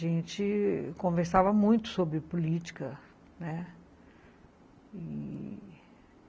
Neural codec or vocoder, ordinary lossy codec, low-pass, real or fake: none; none; none; real